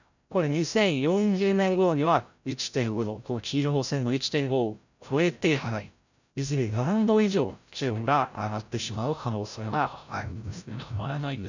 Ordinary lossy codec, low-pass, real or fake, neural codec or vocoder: none; 7.2 kHz; fake; codec, 16 kHz, 0.5 kbps, FreqCodec, larger model